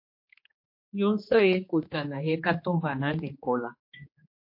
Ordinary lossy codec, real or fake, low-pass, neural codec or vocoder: MP3, 32 kbps; fake; 5.4 kHz; codec, 16 kHz, 2 kbps, X-Codec, HuBERT features, trained on general audio